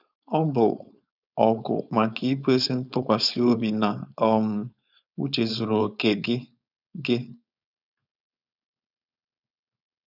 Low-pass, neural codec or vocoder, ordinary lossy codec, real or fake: 5.4 kHz; codec, 16 kHz, 4.8 kbps, FACodec; none; fake